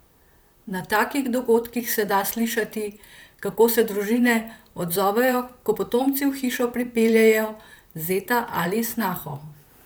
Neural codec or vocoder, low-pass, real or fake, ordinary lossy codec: vocoder, 44.1 kHz, 128 mel bands, Pupu-Vocoder; none; fake; none